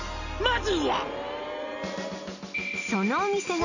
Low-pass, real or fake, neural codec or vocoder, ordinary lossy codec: 7.2 kHz; real; none; none